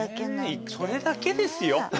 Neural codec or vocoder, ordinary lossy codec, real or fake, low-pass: none; none; real; none